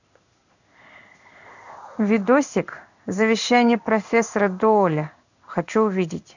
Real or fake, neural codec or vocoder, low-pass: fake; codec, 16 kHz in and 24 kHz out, 1 kbps, XY-Tokenizer; 7.2 kHz